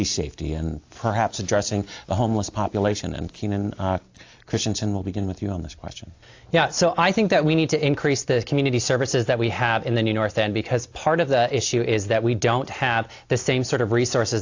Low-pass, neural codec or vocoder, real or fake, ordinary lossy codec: 7.2 kHz; none; real; AAC, 48 kbps